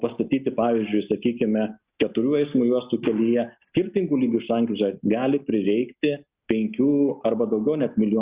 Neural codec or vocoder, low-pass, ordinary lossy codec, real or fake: none; 3.6 kHz; Opus, 64 kbps; real